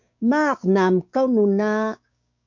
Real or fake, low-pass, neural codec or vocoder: fake; 7.2 kHz; codec, 16 kHz, 6 kbps, DAC